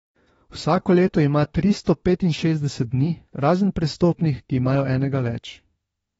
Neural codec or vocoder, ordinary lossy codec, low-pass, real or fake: autoencoder, 48 kHz, 32 numbers a frame, DAC-VAE, trained on Japanese speech; AAC, 24 kbps; 19.8 kHz; fake